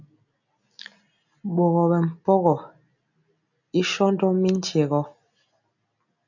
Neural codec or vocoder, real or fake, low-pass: none; real; 7.2 kHz